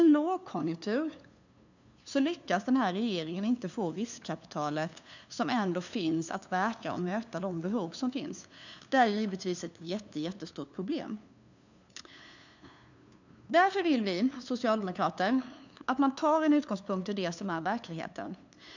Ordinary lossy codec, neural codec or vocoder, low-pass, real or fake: none; codec, 16 kHz, 2 kbps, FunCodec, trained on LibriTTS, 25 frames a second; 7.2 kHz; fake